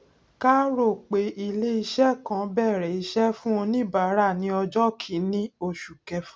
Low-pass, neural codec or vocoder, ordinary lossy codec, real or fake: none; none; none; real